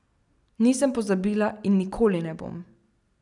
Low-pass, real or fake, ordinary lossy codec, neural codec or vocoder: 10.8 kHz; real; none; none